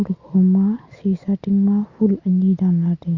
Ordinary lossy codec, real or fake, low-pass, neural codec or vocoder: Opus, 64 kbps; real; 7.2 kHz; none